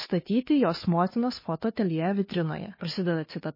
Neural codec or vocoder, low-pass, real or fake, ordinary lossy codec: codec, 16 kHz, 2 kbps, FunCodec, trained on Chinese and English, 25 frames a second; 5.4 kHz; fake; MP3, 24 kbps